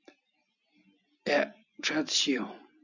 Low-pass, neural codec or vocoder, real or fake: 7.2 kHz; none; real